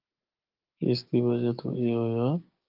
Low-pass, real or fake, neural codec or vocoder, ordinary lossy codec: 5.4 kHz; fake; codec, 16 kHz, 6 kbps, DAC; Opus, 32 kbps